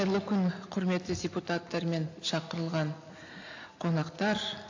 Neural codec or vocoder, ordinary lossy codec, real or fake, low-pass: none; AAC, 48 kbps; real; 7.2 kHz